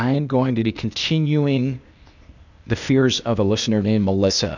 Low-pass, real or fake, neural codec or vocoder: 7.2 kHz; fake; codec, 16 kHz, 0.8 kbps, ZipCodec